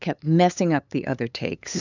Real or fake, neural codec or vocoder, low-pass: fake; codec, 16 kHz, 8 kbps, FunCodec, trained on LibriTTS, 25 frames a second; 7.2 kHz